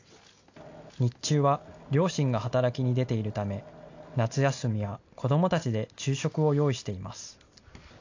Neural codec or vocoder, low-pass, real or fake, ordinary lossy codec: none; 7.2 kHz; real; AAC, 48 kbps